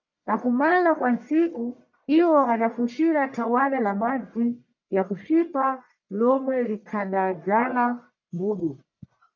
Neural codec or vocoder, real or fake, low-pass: codec, 44.1 kHz, 1.7 kbps, Pupu-Codec; fake; 7.2 kHz